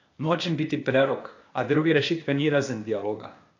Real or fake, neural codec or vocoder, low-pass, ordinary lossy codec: fake; codec, 16 kHz, 0.8 kbps, ZipCodec; 7.2 kHz; AAC, 48 kbps